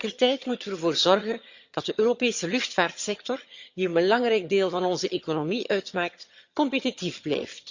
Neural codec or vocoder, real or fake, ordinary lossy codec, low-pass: vocoder, 22.05 kHz, 80 mel bands, HiFi-GAN; fake; Opus, 64 kbps; 7.2 kHz